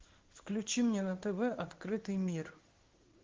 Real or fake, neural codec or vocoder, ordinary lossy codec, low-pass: fake; codec, 16 kHz in and 24 kHz out, 1 kbps, XY-Tokenizer; Opus, 16 kbps; 7.2 kHz